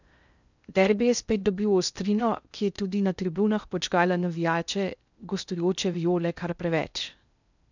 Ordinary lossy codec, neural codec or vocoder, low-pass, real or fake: none; codec, 16 kHz in and 24 kHz out, 0.6 kbps, FocalCodec, streaming, 2048 codes; 7.2 kHz; fake